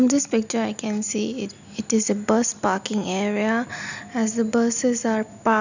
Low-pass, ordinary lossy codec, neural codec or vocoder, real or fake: 7.2 kHz; none; none; real